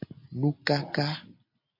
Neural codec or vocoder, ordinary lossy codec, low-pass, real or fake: none; MP3, 32 kbps; 5.4 kHz; real